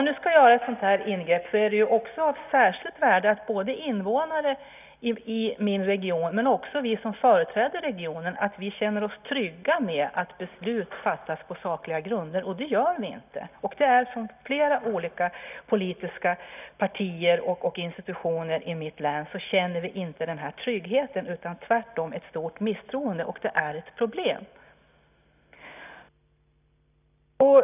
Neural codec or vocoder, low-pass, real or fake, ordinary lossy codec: none; 3.6 kHz; real; none